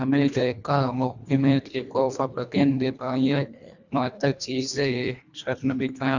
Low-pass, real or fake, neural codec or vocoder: 7.2 kHz; fake; codec, 24 kHz, 1.5 kbps, HILCodec